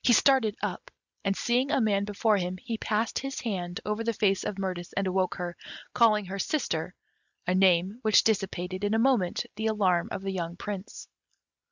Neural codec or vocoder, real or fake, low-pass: none; real; 7.2 kHz